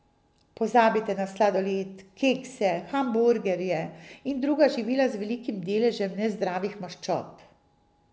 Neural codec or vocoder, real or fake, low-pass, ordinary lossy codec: none; real; none; none